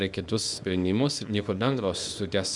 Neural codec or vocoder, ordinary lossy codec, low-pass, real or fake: codec, 24 kHz, 0.9 kbps, WavTokenizer, small release; Opus, 64 kbps; 10.8 kHz; fake